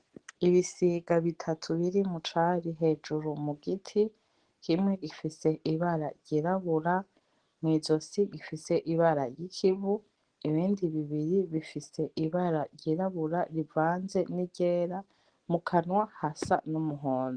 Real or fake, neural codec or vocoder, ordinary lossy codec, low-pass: real; none; Opus, 16 kbps; 9.9 kHz